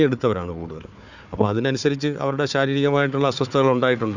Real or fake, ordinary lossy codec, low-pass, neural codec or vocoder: fake; none; 7.2 kHz; codec, 16 kHz, 4 kbps, FunCodec, trained on Chinese and English, 50 frames a second